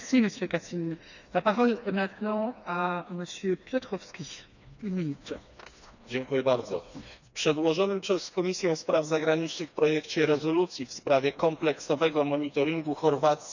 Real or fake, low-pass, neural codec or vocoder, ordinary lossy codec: fake; 7.2 kHz; codec, 16 kHz, 2 kbps, FreqCodec, smaller model; none